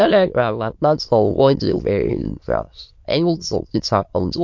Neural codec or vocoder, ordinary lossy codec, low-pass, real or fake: autoencoder, 22.05 kHz, a latent of 192 numbers a frame, VITS, trained on many speakers; MP3, 48 kbps; 7.2 kHz; fake